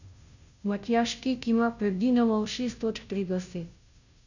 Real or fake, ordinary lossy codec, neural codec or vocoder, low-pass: fake; none; codec, 16 kHz, 0.5 kbps, FunCodec, trained on Chinese and English, 25 frames a second; 7.2 kHz